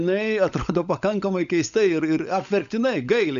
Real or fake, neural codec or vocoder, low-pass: fake; codec, 16 kHz, 16 kbps, FunCodec, trained on LibriTTS, 50 frames a second; 7.2 kHz